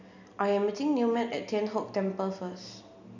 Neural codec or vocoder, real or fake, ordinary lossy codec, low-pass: none; real; none; 7.2 kHz